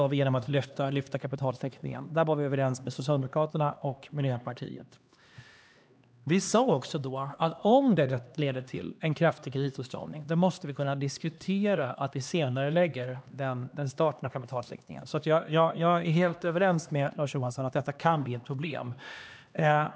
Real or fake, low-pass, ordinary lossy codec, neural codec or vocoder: fake; none; none; codec, 16 kHz, 2 kbps, X-Codec, HuBERT features, trained on LibriSpeech